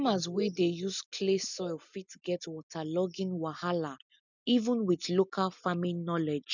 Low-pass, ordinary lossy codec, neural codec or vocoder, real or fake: 7.2 kHz; none; none; real